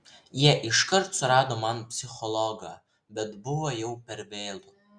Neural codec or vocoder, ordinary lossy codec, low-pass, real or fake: none; Opus, 64 kbps; 9.9 kHz; real